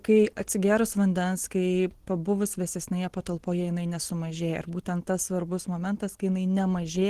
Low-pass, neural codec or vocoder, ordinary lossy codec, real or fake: 14.4 kHz; none; Opus, 16 kbps; real